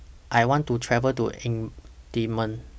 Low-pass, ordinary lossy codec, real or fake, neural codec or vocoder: none; none; real; none